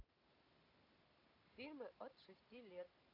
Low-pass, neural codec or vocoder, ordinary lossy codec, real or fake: 5.4 kHz; none; none; real